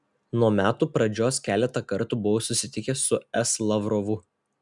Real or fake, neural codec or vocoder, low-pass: real; none; 10.8 kHz